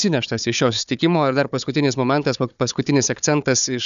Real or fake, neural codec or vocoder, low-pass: fake; codec, 16 kHz, 4 kbps, FunCodec, trained on Chinese and English, 50 frames a second; 7.2 kHz